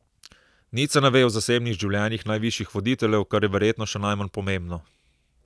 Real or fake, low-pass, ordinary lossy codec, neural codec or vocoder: real; none; none; none